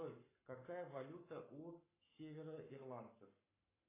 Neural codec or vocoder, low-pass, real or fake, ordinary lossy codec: codec, 44.1 kHz, 7.8 kbps, Pupu-Codec; 3.6 kHz; fake; AAC, 16 kbps